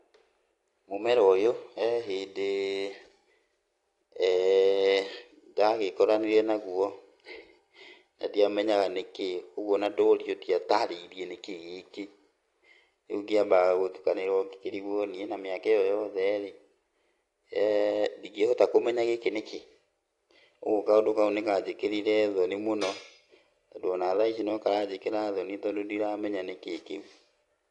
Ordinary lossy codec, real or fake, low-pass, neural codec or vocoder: AAC, 48 kbps; real; 10.8 kHz; none